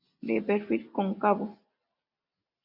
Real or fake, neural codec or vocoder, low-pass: real; none; 5.4 kHz